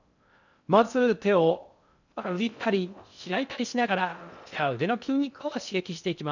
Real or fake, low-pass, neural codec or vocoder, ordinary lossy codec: fake; 7.2 kHz; codec, 16 kHz in and 24 kHz out, 0.6 kbps, FocalCodec, streaming, 2048 codes; none